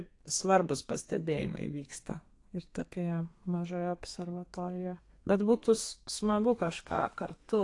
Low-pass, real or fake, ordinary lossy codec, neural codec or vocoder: 10.8 kHz; fake; AAC, 48 kbps; codec, 32 kHz, 1.9 kbps, SNAC